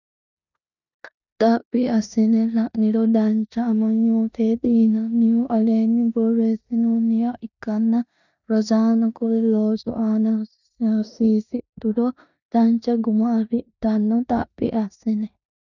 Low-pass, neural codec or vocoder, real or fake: 7.2 kHz; codec, 16 kHz in and 24 kHz out, 0.9 kbps, LongCat-Audio-Codec, four codebook decoder; fake